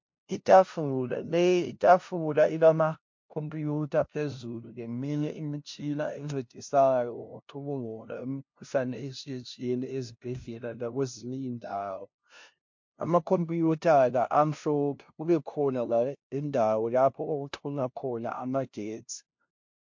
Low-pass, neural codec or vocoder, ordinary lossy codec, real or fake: 7.2 kHz; codec, 16 kHz, 0.5 kbps, FunCodec, trained on LibriTTS, 25 frames a second; MP3, 48 kbps; fake